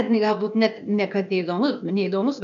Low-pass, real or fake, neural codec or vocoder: 7.2 kHz; fake; codec, 16 kHz, 0.8 kbps, ZipCodec